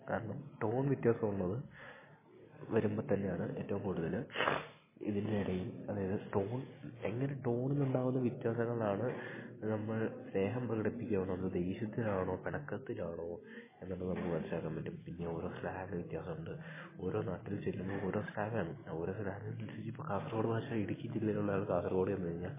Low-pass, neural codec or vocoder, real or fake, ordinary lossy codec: 3.6 kHz; none; real; MP3, 16 kbps